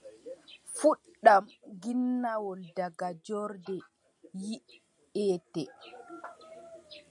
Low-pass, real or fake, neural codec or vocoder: 10.8 kHz; real; none